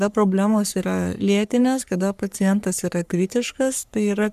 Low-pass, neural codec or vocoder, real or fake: 14.4 kHz; codec, 44.1 kHz, 3.4 kbps, Pupu-Codec; fake